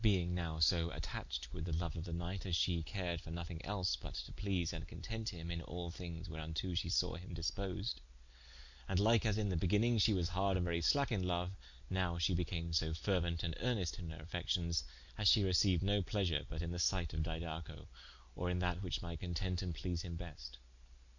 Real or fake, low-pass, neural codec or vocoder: real; 7.2 kHz; none